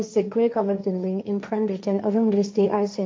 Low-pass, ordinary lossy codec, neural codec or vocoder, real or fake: none; none; codec, 16 kHz, 1.1 kbps, Voila-Tokenizer; fake